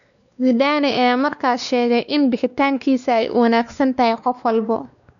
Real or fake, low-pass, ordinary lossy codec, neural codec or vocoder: fake; 7.2 kHz; none; codec, 16 kHz, 2 kbps, X-Codec, WavLM features, trained on Multilingual LibriSpeech